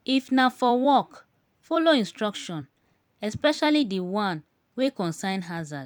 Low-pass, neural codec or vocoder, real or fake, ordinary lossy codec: 19.8 kHz; vocoder, 44.1 kHz, 128 mel bands every 256 samples, BigVGAN v2; fake; none